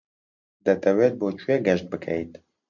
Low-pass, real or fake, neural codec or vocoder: 7.2 kHz; real; none